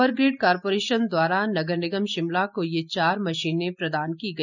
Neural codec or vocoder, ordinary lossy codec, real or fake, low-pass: none; none; real; 7.2 kHz